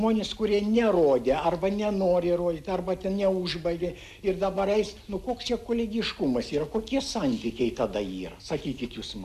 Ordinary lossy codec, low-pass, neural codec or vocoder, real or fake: AAC, 96 kbps; 14.4 kHz; none; real